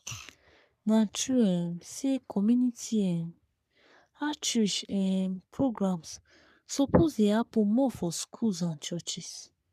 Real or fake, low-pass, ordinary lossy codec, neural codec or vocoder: fake; 14.4 kHz; none; codec, 44.1 kHz, 3.4 kbps, Pupu-Codec